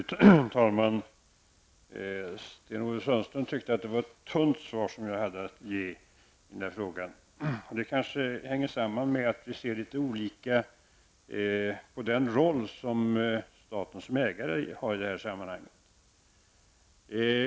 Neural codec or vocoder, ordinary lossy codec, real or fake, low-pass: none; none; real; none